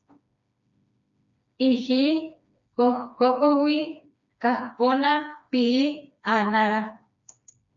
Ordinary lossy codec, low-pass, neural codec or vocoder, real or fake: MP3, 64 kbps; 7.2 kHz; codec, 16 kHz, 2 kbps, FreqCodec, smaller model; fake